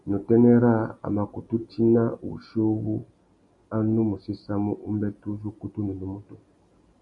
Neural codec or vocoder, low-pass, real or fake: none; 10.8 kHz; real